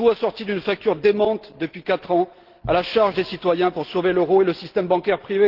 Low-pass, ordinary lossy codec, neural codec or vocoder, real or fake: 5.4 kHz; Opus, 16 kbps; none; real